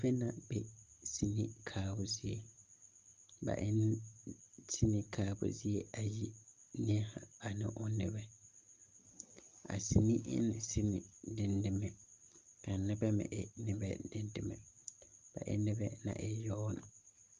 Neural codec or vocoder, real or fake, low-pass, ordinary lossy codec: none; real; 7.2 kHz; Opus, 24 kbps